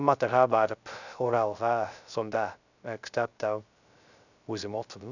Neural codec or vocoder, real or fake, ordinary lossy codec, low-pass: codec, 16 kHz, 0.3 kbps, FocalCodec; fake; none; 7.2 kHz